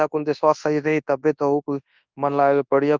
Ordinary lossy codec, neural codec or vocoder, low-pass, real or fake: Opus, 24 kbps; codec, 24 kHz, 0.9 kbps, WavTokenizer, large speech release; 7.2 kHz; fake